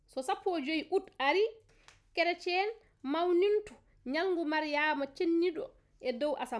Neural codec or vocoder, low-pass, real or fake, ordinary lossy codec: none; none; real; none